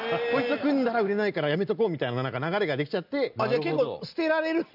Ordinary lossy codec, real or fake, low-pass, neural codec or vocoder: none; real; 5.4 kHz; none